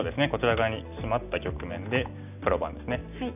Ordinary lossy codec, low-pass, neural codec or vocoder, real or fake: none; 3.6 kHz; none; real